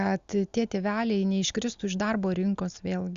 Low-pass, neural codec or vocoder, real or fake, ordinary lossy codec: 7.2 kHz; none; real; Opus, 64 kbps